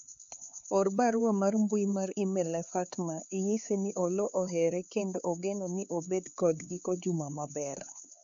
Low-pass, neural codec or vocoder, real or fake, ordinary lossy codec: 7.2 kHz; codec, 16 kHz, 4 kbps, X-Codec, HuBERT features, trained on LibriSpeech; fake; none